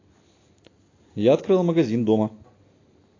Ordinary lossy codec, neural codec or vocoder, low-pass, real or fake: AAC, 32 kbps; none; 7.2 kHz; real